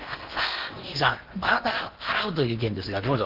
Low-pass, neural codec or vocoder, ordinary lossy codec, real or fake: 5.4 kHz; codec, 16 kHz in and 24 kHz out, 0.8 kbps, FocalCodec, streaming, 65536 codes; Opus, 32 kbps; fake